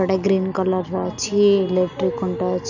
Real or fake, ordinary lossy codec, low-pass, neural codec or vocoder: real; none; 7.2 kHz; none